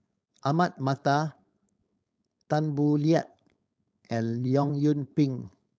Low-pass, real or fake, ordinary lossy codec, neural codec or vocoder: none; fake; none; codec, 16 kHz, 4.8 kbps, FACodec